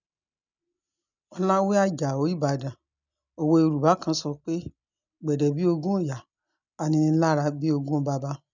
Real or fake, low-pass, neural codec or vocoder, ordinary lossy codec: real; 7.2 kHz; none; none